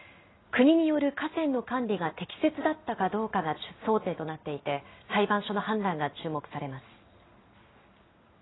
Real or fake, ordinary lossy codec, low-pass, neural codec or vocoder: real; AAC, 16 kbps; 7.2 kHz; none